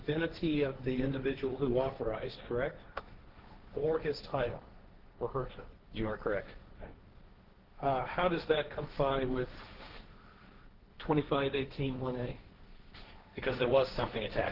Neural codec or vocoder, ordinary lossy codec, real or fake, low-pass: codec, 16 kHz, 1.1 kbps, Voila-Tokenizer; Opus, 16 kbps; fake; 5.4 kHz